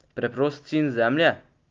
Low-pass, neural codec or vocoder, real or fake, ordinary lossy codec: 7.2 kHz; none; real; Opus, 32 kbps